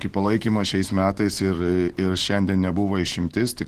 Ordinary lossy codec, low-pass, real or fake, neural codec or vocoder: Opus, 16 kbps; 14.4 kHz; fake; autoencoder, 48 kHz, 128 numbers a frame, DAC-VAE, trained on Japanese speech